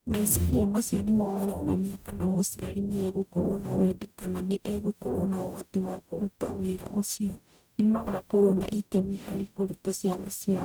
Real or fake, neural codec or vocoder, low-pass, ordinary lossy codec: fake; codec, 44.1 kHz, 0.9 kbps, DAC; none; none